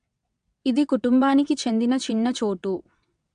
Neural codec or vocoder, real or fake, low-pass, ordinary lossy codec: vocoder, 22.05 kHz, 80 mel bands, WaveNeXt; fake; 9.9 kHz; AAC, 64 kbps